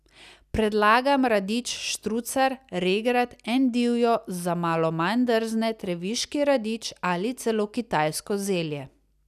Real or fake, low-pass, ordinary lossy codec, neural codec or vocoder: real; 14.4 kHz; none; none